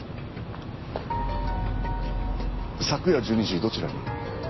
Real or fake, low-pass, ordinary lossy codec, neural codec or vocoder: real; 7.2 kHz; MP3, 24 kbps; none